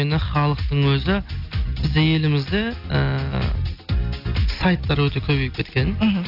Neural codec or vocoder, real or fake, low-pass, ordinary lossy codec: none; real; 5.4 kHz; AAC, 48 kbps